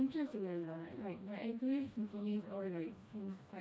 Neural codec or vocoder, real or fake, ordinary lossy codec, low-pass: codec, 16 kHz, 1 kbps, FreqCodec, smaller model; fake; none; none